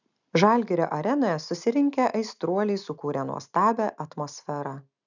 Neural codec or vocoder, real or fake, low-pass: none; real; 7.2 kHz